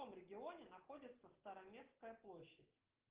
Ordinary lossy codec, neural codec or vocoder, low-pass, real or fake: Opus, 16 kbps; none; 3.6 kHz; real